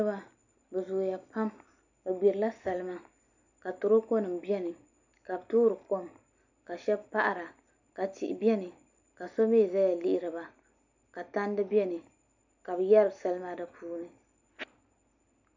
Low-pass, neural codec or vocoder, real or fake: 7.2 kHz; none; real